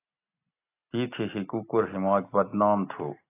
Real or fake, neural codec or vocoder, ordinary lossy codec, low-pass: real; none; AAC, 24 kbps; 3.6 kHz